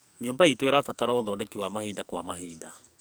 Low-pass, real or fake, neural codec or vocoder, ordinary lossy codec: none; fake; codec, 44.1 kHz, 2.6 kbps, SNAC; none